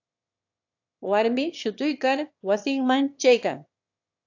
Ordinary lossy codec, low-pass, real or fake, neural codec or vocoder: AAC, 48 kbps; 7.2 kHz; fake; autoencoder, 22.05 kHz, a latent of 192 numbers a frame, VITS, trained on one speaker